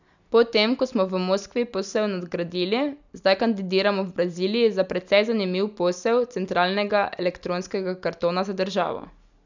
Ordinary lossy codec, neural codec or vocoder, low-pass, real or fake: none; none; 7.2 kHz; real